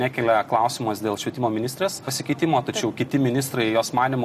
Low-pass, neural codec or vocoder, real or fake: 14.4 kHz; none; real